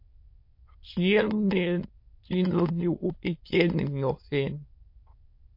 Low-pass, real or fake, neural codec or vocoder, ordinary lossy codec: 5.4 kHz; fake; autoencoder, 22.05 kHz, a latent of 192 numbers a frame, VITS, trained on many speakers; MP3, 32 kbps